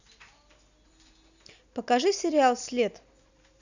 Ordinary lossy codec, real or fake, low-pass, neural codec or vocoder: none; real; 7.2 kHz; none